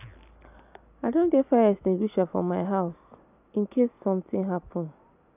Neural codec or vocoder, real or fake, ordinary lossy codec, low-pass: none; real; none; 3.6 kHz